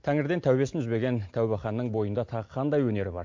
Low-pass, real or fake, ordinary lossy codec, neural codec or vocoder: 7.2 kHz; real; MP3, 48 kbps; none